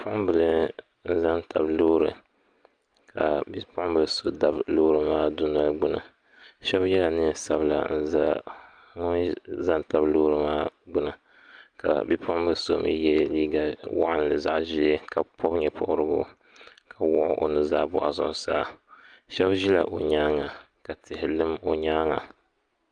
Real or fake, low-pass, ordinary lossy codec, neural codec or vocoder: real; 9.9 kHz; Opus, 32 kbps; none